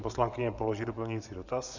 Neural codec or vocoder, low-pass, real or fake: vocoder, 24 kHz, 100 mel bands, Vocos; 7.2 kHz; fake